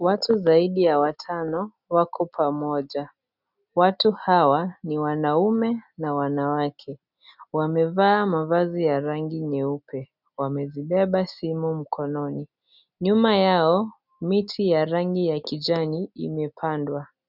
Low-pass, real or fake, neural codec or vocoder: 5.4 kHz; real; none